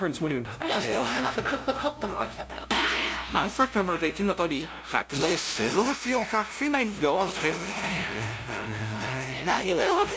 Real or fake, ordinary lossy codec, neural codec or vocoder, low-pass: fake; none; codec, 16 kHz, 0.5 kbps, FunCodec, trained on LibriTTS, 25 frames a second; none